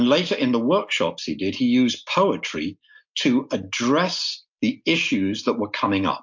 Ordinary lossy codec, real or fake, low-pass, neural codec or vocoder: MP3, 48 kbps; real; 7.2 kHz; none